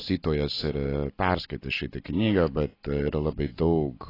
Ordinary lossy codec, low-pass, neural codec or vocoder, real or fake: AAC, 24 kbps; 5.4 kHz; none; real